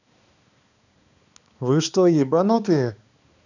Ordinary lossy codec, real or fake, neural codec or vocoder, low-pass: none; fake; codec, 16 kHz, 2 kbps, X-Codec, HuBERT features, trained on balanced general audio; 7.2 kHz